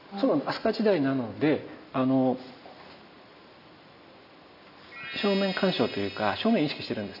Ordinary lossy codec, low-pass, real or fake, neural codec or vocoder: none; 5.4 kHz; real; none